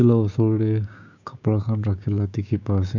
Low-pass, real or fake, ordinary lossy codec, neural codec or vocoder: 7.2 kHz; fake; none; codec, 16 kHz, 6 kbps, DAC